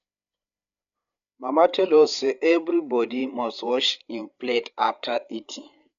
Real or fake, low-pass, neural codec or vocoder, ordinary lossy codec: fake; 7.2 kHz; codec, 16 kHz, 8 kbps, FreqCodec, larger model; none